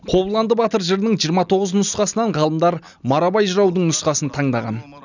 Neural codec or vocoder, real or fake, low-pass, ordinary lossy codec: none; real; 7.2 kHz; none